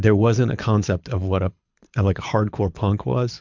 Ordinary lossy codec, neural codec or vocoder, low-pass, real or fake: MP3, 64 kbps; codec, 24 kHz, 6 kbps, HILCodec; 7.2 kHz; fake